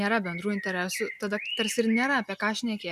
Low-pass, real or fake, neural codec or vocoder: 14.4 kHz; real; none